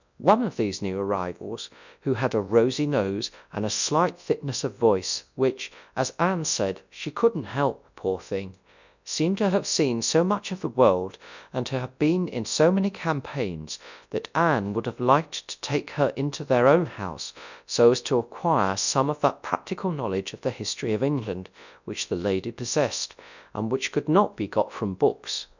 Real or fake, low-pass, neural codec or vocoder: fake; 7.2 kHz; codec, 24 kHz, 0.9 kbps, WavTokenizer, large speech release